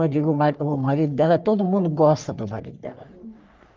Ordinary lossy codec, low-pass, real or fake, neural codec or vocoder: Opus, 32 kbps; 7.2 kHz; fake; codec, 44.1 kHz, 3.4 kbps, Pupu-Codec